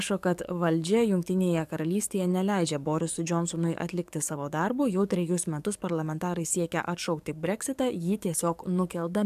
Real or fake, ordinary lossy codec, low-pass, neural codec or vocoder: fake; AAC, 96 kbps; 14.4 kHz; codec, 44.1 kHz, 7.8 kbps, DAC